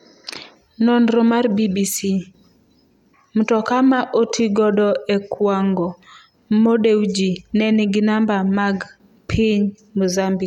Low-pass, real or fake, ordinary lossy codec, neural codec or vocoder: 19.8 kHz; real; none; none